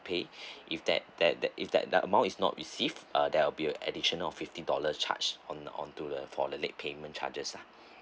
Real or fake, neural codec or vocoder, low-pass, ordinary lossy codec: real; none; none; none